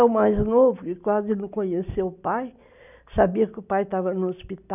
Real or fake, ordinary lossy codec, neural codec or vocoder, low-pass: real; none; none; 3.6 kHz